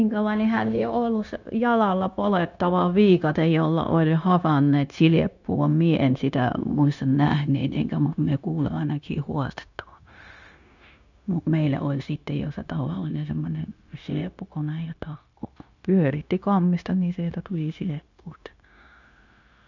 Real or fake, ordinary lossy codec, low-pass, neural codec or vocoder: fake; none; 7.2 kHz; codec, 16 kHz, 0.9 kbps, LongCat-Audio-Codec